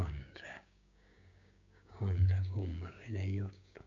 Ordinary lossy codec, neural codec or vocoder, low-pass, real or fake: none; codec, 16 kHz, 6 kbps, DAC; 7.2 kHz; fake